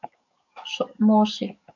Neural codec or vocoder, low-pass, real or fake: codec, 44.1 kHz, 7.8 kbps, DAC; 7.2 kHz; fake